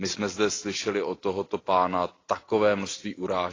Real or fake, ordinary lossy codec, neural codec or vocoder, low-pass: real; AAC, 32 kbps; none; 7.2 kHz